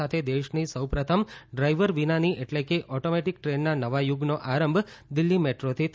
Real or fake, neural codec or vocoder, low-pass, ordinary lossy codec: real; none; none; none